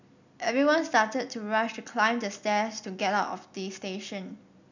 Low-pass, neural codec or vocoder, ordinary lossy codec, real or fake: 7.2 kHz; none; none; real